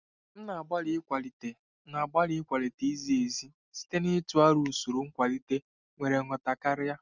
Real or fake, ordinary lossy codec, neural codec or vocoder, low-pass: real; none; none; 7.2 kHz